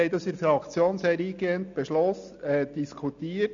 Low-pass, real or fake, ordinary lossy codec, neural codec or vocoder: 7.2 kHz; real; AAC, 64 kbps; none